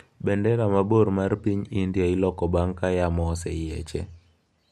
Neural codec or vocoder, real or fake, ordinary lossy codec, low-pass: none; real; MP3, 64 kbps; 14.4 kHz